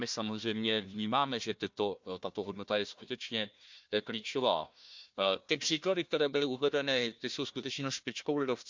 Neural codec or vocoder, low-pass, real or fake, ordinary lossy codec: codec, 16 kHz, 1 kbps, FunCodec, trained on Chinese and English, 50 frames a second; 7.2 kHz; fake; MP3, 64 kbps